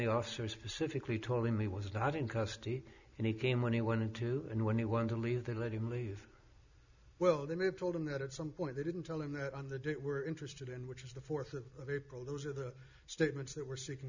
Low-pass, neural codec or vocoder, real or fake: 7.2 kHz; none; real